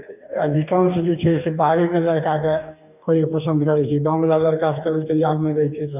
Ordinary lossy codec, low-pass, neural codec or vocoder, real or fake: none; 3.6 kHz; codec, 44.1 kHz, 2.6 kbps, DAC; fake